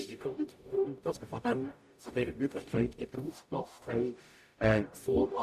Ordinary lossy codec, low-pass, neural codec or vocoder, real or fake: AAC, 96 kbps; 14.4 kHz; codec, 44.1 kHz, 0.9 kbps, DAC; fake